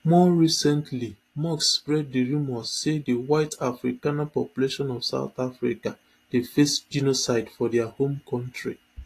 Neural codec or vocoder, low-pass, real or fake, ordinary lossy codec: none; 14.4 kHz; real; AAC, 48 kbps